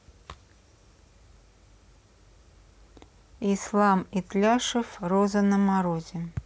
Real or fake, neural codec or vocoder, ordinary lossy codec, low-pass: real; none; none; none